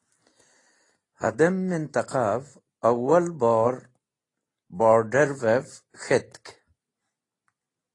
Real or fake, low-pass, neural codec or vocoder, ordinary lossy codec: real; 10.8 kHz; none; AAC, 32 kbps